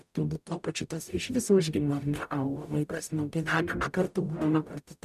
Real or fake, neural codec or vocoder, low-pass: fake; codec, 44.1 kHz, 0.9 kbps, DAC; 14.4 kHz